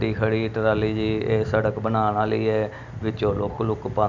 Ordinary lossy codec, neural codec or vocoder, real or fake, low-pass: none; none; real; 7.2 kHz